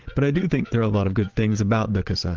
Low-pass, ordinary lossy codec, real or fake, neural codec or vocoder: 7.2 kHz; Opus, 16 kbps; fake; vocoder, 22.05 kHz, 80 mel bands, Vocos